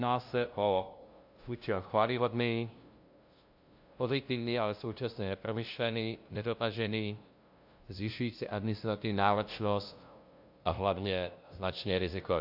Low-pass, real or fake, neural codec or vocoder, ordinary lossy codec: 5.4 kHz; fake; codec, 16 kHz, 0.5 kbps, FunCodec, trained on LibriTTS, 25 frames a second; AAC, 48 kbps